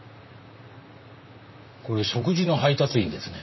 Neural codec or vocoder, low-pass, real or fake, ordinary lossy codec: vocoder, 44.1 kHz, 128 mel bands, Pupu-Vocoder; 7.2 kHz; fake; MP3, 24 kbps